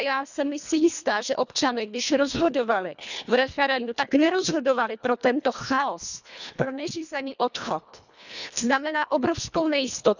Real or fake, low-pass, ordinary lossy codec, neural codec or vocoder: fake; 7.2 kHz; none; codec, 24 kHz, 1.5 kbps, HILCodec